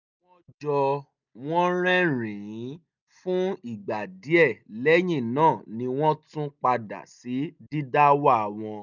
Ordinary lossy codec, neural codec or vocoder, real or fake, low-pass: none; none; real; 7.2 kHz